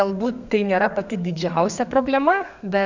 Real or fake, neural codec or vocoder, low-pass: fake; codec, 32 kHz, 1.9 kbps, SNAC; 7.2 kHz